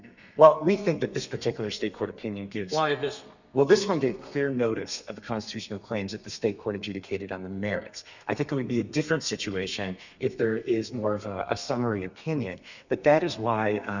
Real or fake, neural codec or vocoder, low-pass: fake; codec, 32 kHz, 1.9 kbps, SNAC; 7.2 kHz